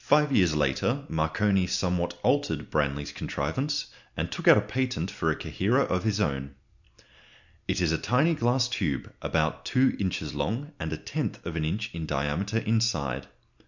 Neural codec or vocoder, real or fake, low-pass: none; real; 7.2 kHz